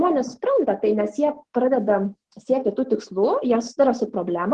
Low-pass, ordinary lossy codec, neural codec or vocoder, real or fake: 10.8 kHz; Opus, 16 kbps; autoencoder, 48 kHz, 128 numbers a frame, DAC-VAE, trained on Japanese speech; fake